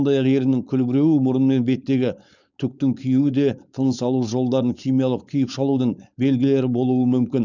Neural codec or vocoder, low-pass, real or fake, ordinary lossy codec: codec, 16 kHz, 4.8 kbps, FACodec; 7.2 kHz; fake; none